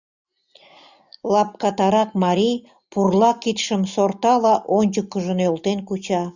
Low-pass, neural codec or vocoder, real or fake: 7.2 kHz; none; real